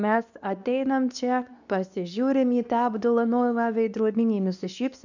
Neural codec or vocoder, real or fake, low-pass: codec, 24 kHz, 0.9 kbps, WavTokenizer, medium speech release version 2; fake; 7.2 kHz